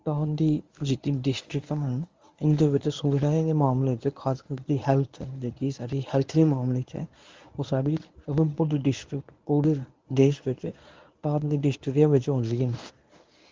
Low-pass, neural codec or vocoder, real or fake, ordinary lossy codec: 7.2 kHz; codec, 24 kHz, 0.9 kbps, WavTokenizer, medium speech release version 1; fake; Opus, 32 kbps